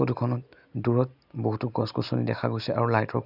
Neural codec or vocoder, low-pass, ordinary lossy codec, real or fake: none; 5.4 kHz; none; real